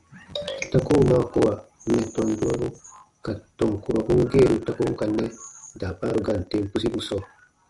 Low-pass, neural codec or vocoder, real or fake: 10.8 kHz; none; real